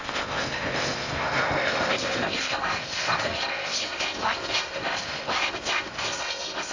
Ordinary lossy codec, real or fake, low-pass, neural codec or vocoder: AAC, 48 kbps; fake; 7.2 kHz; codec, 16 kHz in and 24 kHz out, 0.6 kbps, FocalCodec, streaming, 2048 codes